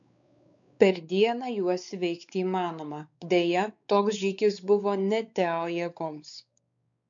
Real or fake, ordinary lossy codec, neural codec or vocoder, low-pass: fake; MP3, 96 kbps; codec, 16 kHz, 4 kbps, X-Codec, WavLM features, trained on Multilingual LibriSpeech; 7.2 kHz